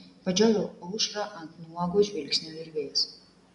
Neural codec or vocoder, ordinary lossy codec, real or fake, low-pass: none; MP3, 64 kbps; real; 10.8 kHz